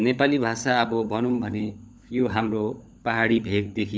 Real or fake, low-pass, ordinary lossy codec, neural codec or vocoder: fake; none; none; codec, 16 kHz, 16 kbps, FunCodec, trained on LibriTTS, 50 frames a second